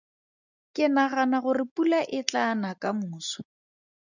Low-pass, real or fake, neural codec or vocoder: 7.2 kHz; real; none